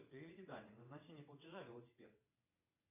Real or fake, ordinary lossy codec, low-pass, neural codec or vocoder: fake; MP3, 24 kbps; 3.6 kHz; vocoder, 22.05 kHz, 80 mel bands, WaveNeXt